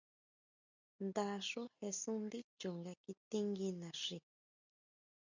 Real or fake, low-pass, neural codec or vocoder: real; 7.2 kHz; none